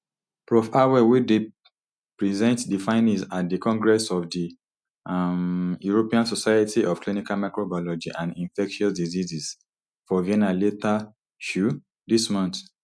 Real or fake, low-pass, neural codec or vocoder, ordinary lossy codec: real; none; none; none